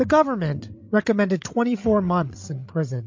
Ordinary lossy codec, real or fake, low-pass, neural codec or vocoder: MP3, 48 kbps; fake; 7.2 kHz; codec, 16 kHz, 16 kbps, FreqCodec, larger model